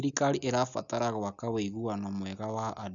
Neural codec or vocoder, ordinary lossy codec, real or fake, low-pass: none; none; real; 7.2 kHz